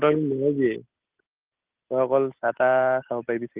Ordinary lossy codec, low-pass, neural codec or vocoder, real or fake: Opus, 24 kbps; 3.6 kHz; none; real